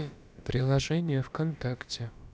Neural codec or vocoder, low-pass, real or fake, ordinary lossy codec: codec, 16 kHz, about 1 kbps, DyCAST, with the encoder's durations; none; fake; none